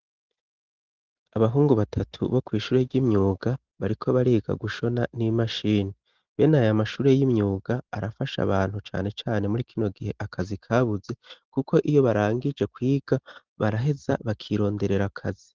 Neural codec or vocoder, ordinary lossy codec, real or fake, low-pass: none; Opus, 16 kbps; real; 7.2 kHz